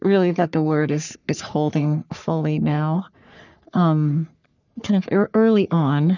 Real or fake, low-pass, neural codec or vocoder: fake; 7.2 kHz; codec, 44.1 kHz, 3.4 kbps, Pupu-Codec